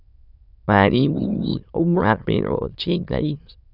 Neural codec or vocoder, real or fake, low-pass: autoencoder, 22.05 kHz, a latent of 192 numbers a frame, VITS, trained on many speakers; fake; 5.4 kHz